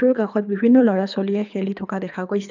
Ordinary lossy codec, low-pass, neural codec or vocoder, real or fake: none; 7.2 kHz; codec, 24 kHz, 3 kbps, HILCodec; fake